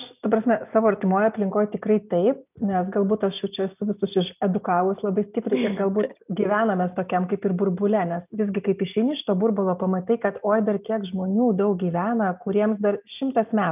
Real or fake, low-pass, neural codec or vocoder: real; 3.6 kHz; none